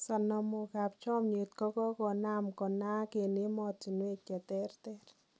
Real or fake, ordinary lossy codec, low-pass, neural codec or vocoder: real; none; none; none